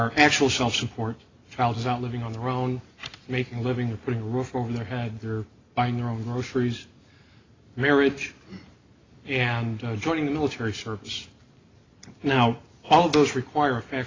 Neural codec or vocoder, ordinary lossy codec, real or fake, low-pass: none; AAC, 32 kbps; real; 7.2 kHz